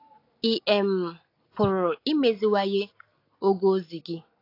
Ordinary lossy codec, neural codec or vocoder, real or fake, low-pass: AAC, 32 kbps; none; real; 5.4 kHz